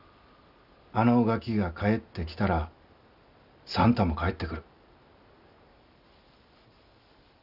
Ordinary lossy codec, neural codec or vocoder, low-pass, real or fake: none; none; 5.4 kHz; real